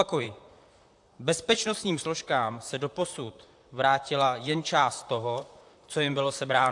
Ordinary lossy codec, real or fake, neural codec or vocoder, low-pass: AAC, 64 kbps; fake; vocoder, 44.1 kHz, 128 mel bands, Pupu-Vocoder; 10.8 kHz